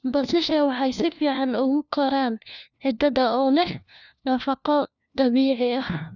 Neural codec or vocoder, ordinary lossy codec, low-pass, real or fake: codec, 16 kHz, 1 kbps, FunCodec, trained on LibriTTS, 50 frames a second; none; 7.2 kHz; fake